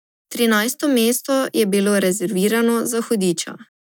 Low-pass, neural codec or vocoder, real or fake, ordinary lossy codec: none; none; real; none